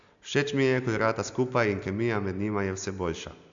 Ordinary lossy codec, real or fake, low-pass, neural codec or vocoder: AAC, 64 kbps; real; 7.2 kHz; none